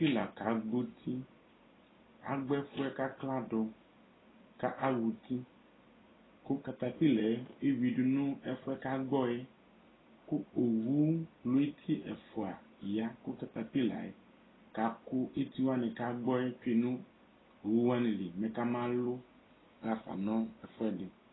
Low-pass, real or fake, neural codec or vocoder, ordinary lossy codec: 7.2 kHz; real; none; AAC, 16 kbps